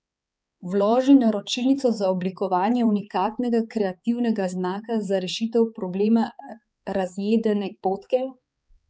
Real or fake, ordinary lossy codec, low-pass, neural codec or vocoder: fake; none; none; codec, 16 kHz, 4 kbps, X-Codec, HuBERT features, trained on balanced general audio